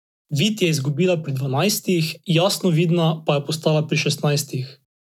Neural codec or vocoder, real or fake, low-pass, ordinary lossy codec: none; real; 19.8 kHz; none